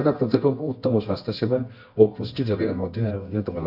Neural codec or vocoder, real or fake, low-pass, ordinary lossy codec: codec, 24 kHz, 0.9 kbps, WavTokenizer, medium music audio release; fake; 5.4 kHz; none